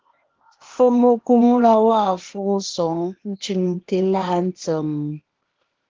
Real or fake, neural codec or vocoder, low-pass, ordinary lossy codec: fake; codec, 16 kHz, 0.8 kbps, ZipCodec; 7.2 kHz; Opus, 16 kbps